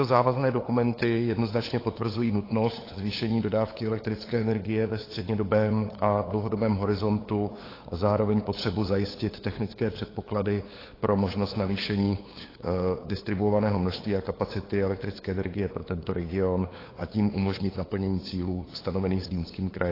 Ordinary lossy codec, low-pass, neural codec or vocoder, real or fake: AAC, 24 kbps; 5.4 kHz; codec, 16 kHz, 8 kbps, FunCodec, trained on LibriTTS, 25 frames a second; fake